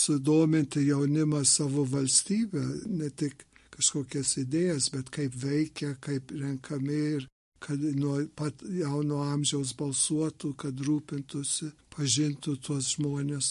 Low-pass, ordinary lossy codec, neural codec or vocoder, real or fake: 14.4 kHz; MP3, 48 kbps; none; real